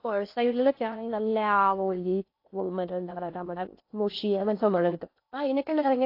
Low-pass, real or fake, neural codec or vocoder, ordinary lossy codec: 5.4 kHz; fake; codec, 16 kHz in and 24 kHz out, 0.6 kbps, FocalCodec, streaming, 2048 codes; AAC, 32 kbps